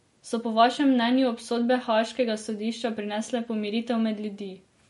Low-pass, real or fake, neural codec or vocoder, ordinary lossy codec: 19.8 kHz; real; none; MP3, 48 kbps